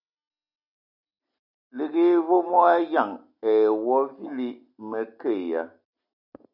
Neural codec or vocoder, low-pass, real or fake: none; 5.4 kHz; real